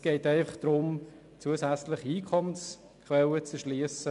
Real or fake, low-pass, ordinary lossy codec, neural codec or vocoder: real; 10.8 kHz; none; none